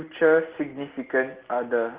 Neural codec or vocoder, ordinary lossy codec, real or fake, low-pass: none; Opus, 16 kbps; real; 3.6 kHz